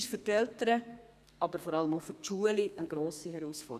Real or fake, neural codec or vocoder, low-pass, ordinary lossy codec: fake; codec, 32 kHz, 1.9 kbps, SNAC; 14.4 kHz; none